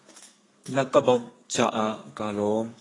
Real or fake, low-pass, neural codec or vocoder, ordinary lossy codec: fake; 10.8 kHz; codec, 32 kHz, 1.9 kbps, SNAC; AAC, 32 kbps